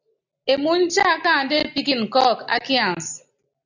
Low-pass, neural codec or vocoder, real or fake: 7.2 kHz; none; real